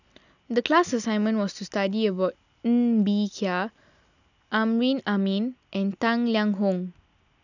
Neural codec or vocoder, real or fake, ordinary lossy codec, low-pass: none; real; none; 7.2 kHz